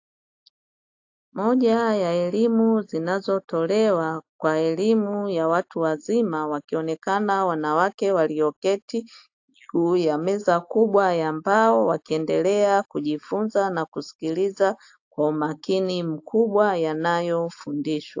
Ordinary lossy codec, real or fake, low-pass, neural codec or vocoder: AAC, 48 kbps; real; 7.2 kHz; none